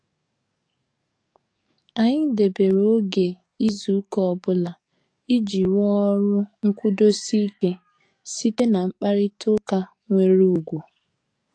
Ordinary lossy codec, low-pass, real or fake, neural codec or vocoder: AAC, 48 kbps; 9.9 kHz; fake; codec, 44.1 kHz, 7.8 kbps, DAC